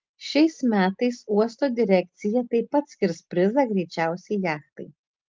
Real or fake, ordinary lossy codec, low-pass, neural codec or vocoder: real; Opus, 24 kbps; 7.2 kHz; none